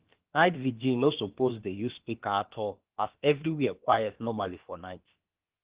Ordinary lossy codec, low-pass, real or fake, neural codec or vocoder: Opus, 16 kbps; 3.6 kHz; fake; codec, 16 kHz, about 1 kbps, DyCAST, with the encoder's durations